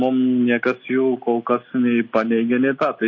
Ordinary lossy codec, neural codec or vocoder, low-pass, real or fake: MP3, 32 kbps; none; 7.2 kHz; real